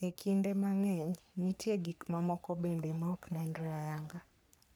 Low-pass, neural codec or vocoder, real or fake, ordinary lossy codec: none; codec, 44.1 kHz, 3.4 kbps, Pupu-Codec; fake; none